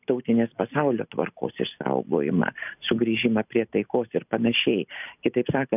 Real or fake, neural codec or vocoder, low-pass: real; none; 3.6 kHz